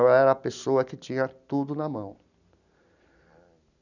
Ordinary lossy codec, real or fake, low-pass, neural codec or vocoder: none; real; 7.2 kHz; none